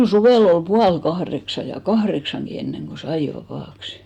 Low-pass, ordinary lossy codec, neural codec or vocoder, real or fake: 19.8 kHz; none; none; real